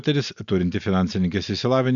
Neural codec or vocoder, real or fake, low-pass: none; real; 7.2 kHz